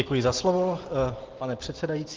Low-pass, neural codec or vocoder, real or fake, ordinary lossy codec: 7.2 kHz; none; real; Opus, 16 kbps